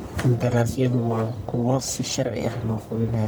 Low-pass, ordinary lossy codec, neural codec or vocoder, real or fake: none; none; codec, 44.1 kHz, 1.7 kbps, Pupu-Codec; fake